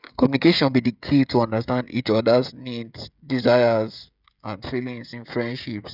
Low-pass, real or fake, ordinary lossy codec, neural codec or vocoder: 5.4 kHz; fake; none; codec, 16 kHz, 16 kbps, FreqCodec, smaller model